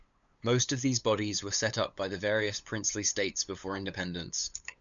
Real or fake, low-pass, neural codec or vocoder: fake; 7.2 kHz; codec, 16 kHz, 8 kbps, FunCodec, trained on LibriTTS, 25 frames a second